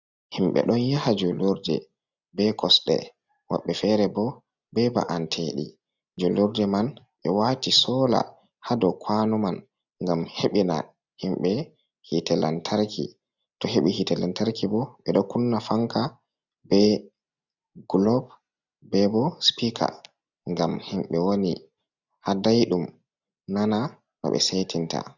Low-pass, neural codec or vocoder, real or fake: 7.2 kHz; none; real